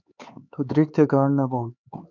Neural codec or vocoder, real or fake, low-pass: codec, 16 kHz, 4 kbps, X-Codec, HuBERT features, trained on LibriSpeech; fake; 7.2 kHz